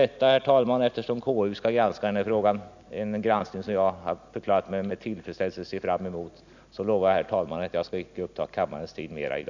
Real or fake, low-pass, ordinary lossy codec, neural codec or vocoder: real; 7.2 kHz; none; none